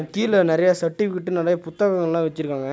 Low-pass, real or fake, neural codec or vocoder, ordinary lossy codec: none; real; none; none